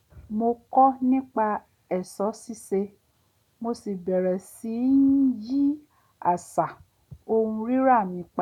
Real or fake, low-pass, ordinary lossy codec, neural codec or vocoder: real; 19.8 kHz; none; none